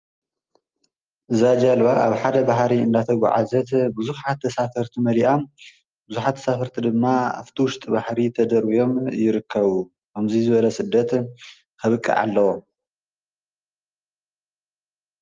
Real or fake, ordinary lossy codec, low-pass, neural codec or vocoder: real; Opus, 32 kbps; 7.2 kHz; none